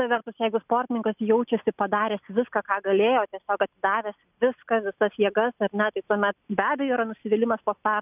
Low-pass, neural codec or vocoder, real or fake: 3.6 kHz; none; real